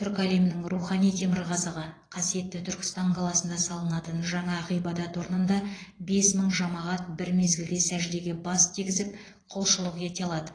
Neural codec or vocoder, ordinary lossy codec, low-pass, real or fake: vocoder, 22.05 kHz, 80 mel bands, Vocos; AAC, 32 kbps; 9.9 kHz; fake